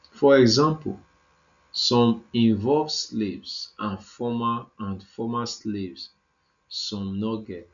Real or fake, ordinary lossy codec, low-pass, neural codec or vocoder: real; none; 7.2 kHz; none